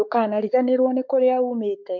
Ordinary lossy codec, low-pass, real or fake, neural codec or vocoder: MP3, 48 kbps; 7.2 kHz; fake; codec, 44.1 kHz, 7.8 kbps, Pupu-Codec